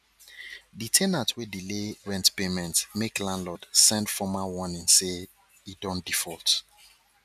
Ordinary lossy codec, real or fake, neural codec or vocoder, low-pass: none; real; none; 14.4 kHz